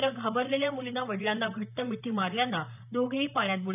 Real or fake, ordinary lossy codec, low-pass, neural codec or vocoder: fake; none; 3.6 kHz; vocoder, 44.1 kHz, 128 mel bands, Pupu-Vocoder